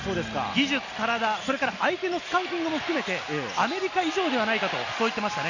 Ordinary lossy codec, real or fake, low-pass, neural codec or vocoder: none; real; 7.2 kHz; none